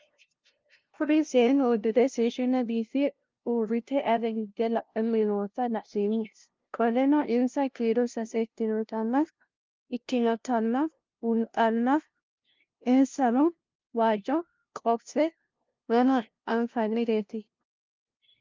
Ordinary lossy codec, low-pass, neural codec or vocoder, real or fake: Opus, 24 kbps; 7.2 kHz; codec, 16 kHz, 0.5 kbps, FunCodec, trained on LibriTTS, 25 frames a second; fake